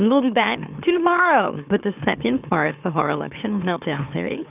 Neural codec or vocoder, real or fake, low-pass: autoencoder, 44.1 kHz, a latent of 192 numbers a frame, MeloTTS; fake; 3.6 kHz